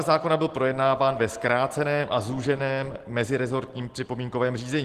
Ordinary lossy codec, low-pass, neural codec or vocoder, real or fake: Opus, 16 kbps; 14.4 kHz; none; real